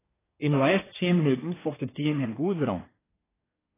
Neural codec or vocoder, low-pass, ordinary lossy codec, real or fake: codec, 24 kHz, 1 kbps, SNAC; 3.6 kHz; AAC, 16 kbps; fake